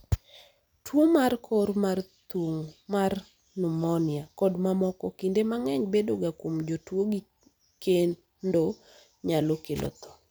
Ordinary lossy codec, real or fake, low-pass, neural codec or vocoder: none; real; none; none